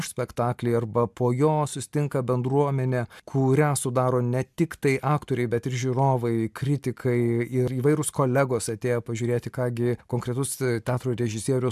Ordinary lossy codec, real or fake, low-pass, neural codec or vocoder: MP3, 96 kbps; real; 14.4 kHz; none